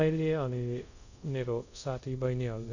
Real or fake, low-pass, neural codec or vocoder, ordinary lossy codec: fake; 7.2 kHz; codec, 24 kHz, 0.5 kbps, DualCodec; none